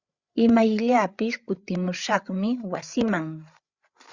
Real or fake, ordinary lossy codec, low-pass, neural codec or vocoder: fake; Opus, 64 kbps; 7.2 kHz; codec, 16 kHz, 8 kbps, FreqCodec, larger model